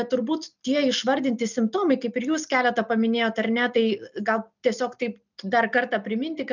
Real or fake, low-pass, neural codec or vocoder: real; 7.2 kHz; none